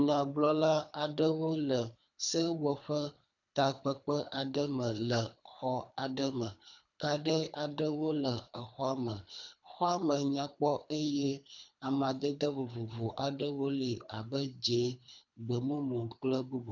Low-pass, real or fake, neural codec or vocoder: 7.2 kHz; fake; codec, 24 kHz, 3 kbps, HILCodec